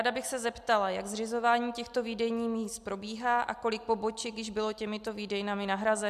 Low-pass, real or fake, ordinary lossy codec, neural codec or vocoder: 14.4 kHz; real; MP3, 96 kbps; none